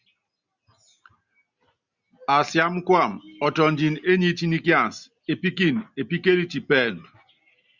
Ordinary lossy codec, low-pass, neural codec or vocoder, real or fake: Opus, 64 kbps; 7.2 kHz; none; real